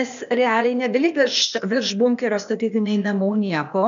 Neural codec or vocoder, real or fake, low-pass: codec, 16 kHz, 0.8 kbps, ZipCodec; fake; 7.2 kHz